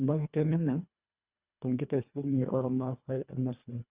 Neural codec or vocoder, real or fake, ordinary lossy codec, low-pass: codec, 24 kHz, 1.5 kbps, HILCodec; fake; Opus, 64 kbps; 3.6 kHz